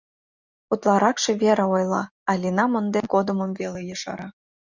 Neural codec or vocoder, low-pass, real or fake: none; 7.2 kHz; real